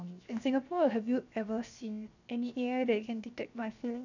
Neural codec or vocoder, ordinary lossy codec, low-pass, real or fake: codec, 16 kHz, 0.7 kbps, FocalCodec; none; 7.2 kHz; fake